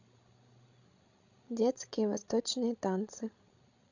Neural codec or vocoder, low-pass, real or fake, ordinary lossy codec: codec, 16 kHz, 16 kbps, FreqCodec, larger model; 7.2 kHz; fake; none